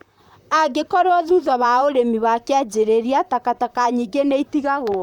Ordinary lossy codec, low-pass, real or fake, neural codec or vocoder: none; 19.8 kHz; fake; vocoder, 44.1 kHz, 128 mel bands, Pupu-Vocoder